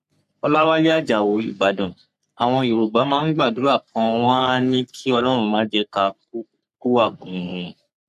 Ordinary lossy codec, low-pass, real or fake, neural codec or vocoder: none; 14.4 kHz; fake; codec, 44.1 kHz, 3.4 kbps, Pupu-Codec